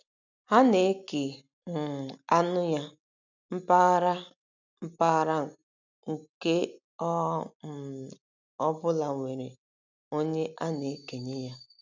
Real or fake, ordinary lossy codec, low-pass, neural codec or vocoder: real; none; 7.2 kHz; none